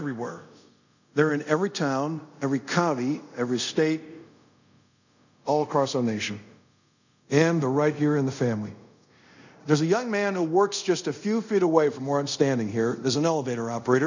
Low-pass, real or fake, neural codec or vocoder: 7.2 kHz; fake; codec, 24 kHz, 0.5 kbps, DualCodec